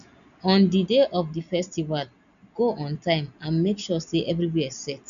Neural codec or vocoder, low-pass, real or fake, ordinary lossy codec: none; 7.2 kHz; real; none